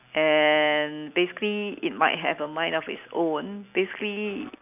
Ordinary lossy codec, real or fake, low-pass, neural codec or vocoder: none; real; 3.6 kHz; none